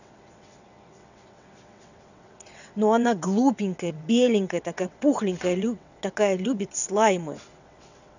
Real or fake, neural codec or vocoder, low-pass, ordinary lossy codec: fake; vocoder, 22.05 kHz, 80 mel bands, WaveNeXt; 7.2 kHz; none